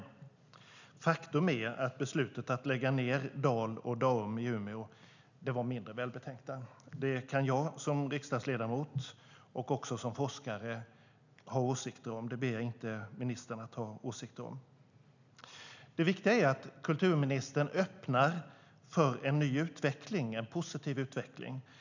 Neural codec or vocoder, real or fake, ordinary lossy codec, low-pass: none; real; none; 7.2 kHz